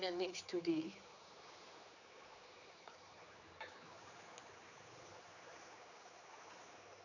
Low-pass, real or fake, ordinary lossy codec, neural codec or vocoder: 7.2 kHz; fake; none; codec, 16 kHz, 4 kbps, X-Codec, HuBERT features, trained on general audio